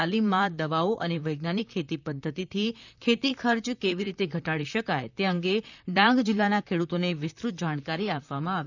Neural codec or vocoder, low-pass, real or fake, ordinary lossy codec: vocoder, 44.1 kHz, 128 mel bands, Pupu-Vocoder; 7.2 kHz; fake; none